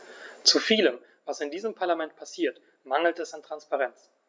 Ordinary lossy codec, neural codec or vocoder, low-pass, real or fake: none; none; none; real